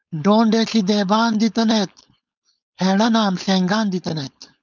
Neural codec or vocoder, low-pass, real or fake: codec, 16 kHz, 4.8 kbps, FACodec; 7.2 kHz; fake